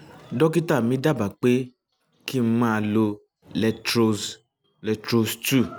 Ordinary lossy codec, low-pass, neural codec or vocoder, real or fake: none; none; none; real